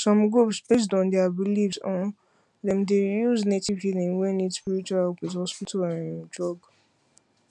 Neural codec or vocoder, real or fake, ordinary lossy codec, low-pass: none; real; none; 10.8 kHz